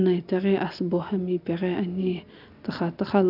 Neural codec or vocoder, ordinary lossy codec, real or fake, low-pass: none; none; real; 5.4 kHz